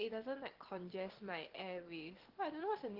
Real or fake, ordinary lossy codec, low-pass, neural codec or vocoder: real; Opus, 16 kbps; 5.4 kHz; none